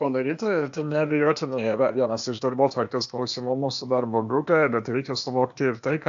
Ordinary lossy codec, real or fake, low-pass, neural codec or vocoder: AAC, 64 kbps; fake; 7.2 kHz; codec, 16 kHz, 0.8 kbps, ZipCodec